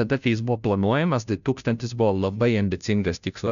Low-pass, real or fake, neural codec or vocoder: 7.2 kHz; fake; codec, 16 kHz, 0.5 kbps, FunCodec, trained on Chinese and English, 25 frames a second